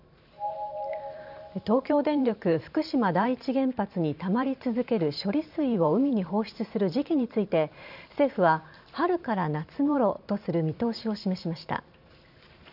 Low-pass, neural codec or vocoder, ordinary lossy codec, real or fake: 5.4 kHz; vocoder, 44.1 kHz, 128 mel bands every 512 samples, BigVGAN v2; none; fake